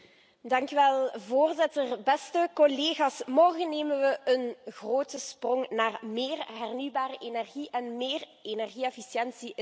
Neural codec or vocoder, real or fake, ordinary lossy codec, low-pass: none; real; none; none